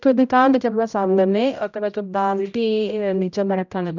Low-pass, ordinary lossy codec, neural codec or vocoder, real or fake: 7.2 kHz; none; codec, 16 kHz, 0.5 kbps, X-Codec, HuBERT features, trained on general audio; fake